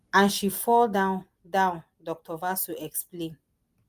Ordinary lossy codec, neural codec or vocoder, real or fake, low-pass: Opus, 32 kbps; none; real; 14.4 kHz